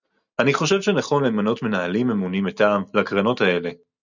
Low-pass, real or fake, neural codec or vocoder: 7.2 kHz; real; none